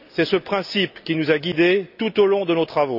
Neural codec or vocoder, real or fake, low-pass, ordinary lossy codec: none; real; 5.4 kHz; none